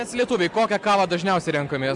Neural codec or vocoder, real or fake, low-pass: none; real; 10.8 kHz